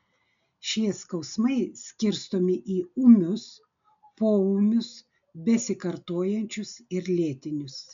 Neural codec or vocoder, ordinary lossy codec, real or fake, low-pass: none; MP3, 64 kbps; real; 7.2 kHz